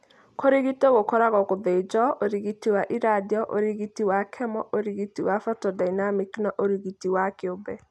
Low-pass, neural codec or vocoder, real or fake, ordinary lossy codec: none; none; real; none